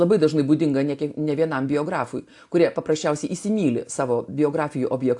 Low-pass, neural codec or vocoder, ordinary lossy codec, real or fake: 10.8 kHz; none; AAC, 64 kbps; real